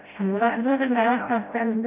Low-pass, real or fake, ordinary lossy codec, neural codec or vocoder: 3.6 kHz; fake; none; codec, 16 kHz, 0.5 kbps, FreqCodec, smaller model